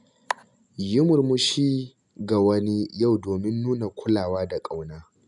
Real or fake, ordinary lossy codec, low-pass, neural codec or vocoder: real; none; 10.8 kHz; none